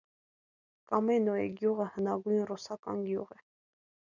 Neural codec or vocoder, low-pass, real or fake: none; 7.2 kHz; real